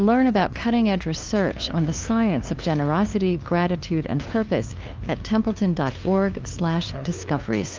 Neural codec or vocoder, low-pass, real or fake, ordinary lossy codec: codec, 16 kHz, 2 kbps, FunCodec, trained on LibriTTS, 25 frames a second; 7.2 kHz; fake; Opus, 24 kbps